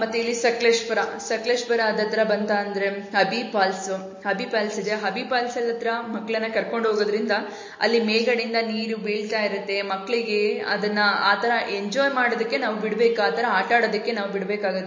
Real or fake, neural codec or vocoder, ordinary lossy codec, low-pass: real; none; MP3, 32 kbps; 7.2 kHz